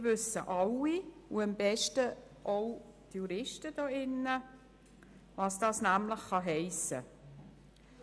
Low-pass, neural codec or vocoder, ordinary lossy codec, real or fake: none; none; none; real